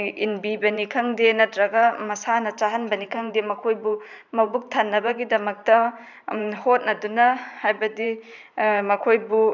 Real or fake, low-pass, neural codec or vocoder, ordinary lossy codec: fake; 7.2 kHz; vocoder, 44.1 kHz, 128 mel bands every 512 samples, BigVGAN v2; none